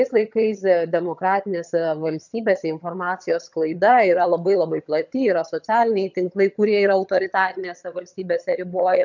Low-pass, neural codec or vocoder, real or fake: 7.2 kHz; vocoder, 22.05 kHz, 80 mel bands, HiFi-GAN; fake